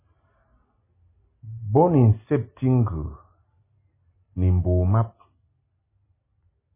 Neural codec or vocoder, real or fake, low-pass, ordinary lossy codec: none; real; 3.6 kHz; MP3, 16 kbps